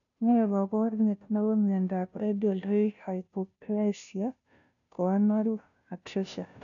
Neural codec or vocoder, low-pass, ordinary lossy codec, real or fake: codec, 16 kHz, 0.5 kbps, FunCodec, trained on Chinese and English, 25 frames a second; 7.2 kHz; none; fake